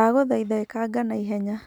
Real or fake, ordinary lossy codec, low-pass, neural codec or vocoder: real; none; 19.8 kHz; none